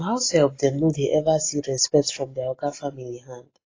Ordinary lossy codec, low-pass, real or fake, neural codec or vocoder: AAC, 32 kbps; 7.2 kHz; real; none